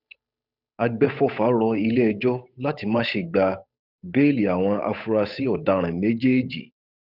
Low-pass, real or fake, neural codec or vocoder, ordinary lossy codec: 5.4 kHz; fake; codec, 16 kHz, 8 kbps, FunCodec, trained on Chinese and English, 25 frames a second; none